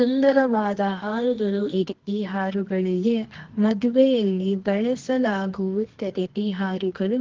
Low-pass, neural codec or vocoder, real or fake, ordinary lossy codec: 7.2 kHz; codec, 24 kHz, 0.9 kbps, WavTokenizer, medium music audio release; fake; Opus, 16 kbps